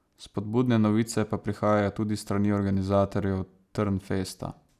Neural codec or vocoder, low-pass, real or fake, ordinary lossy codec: none; 14.4 kHz; real; none